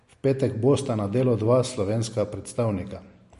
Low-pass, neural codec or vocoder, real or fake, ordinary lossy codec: 14.4 kHz; none; real; MP3, 48 kbps